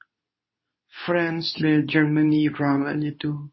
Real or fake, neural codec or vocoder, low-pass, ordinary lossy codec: fake; codec, 24 kHz, 0.9 kbps, WavTokenizer, medium speech release version 2; 7.2 kHz; MP3, 24 kbps